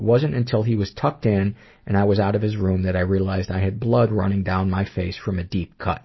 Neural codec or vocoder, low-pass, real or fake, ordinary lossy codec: none; 7.2 kHz; real; MP3, 24 kbps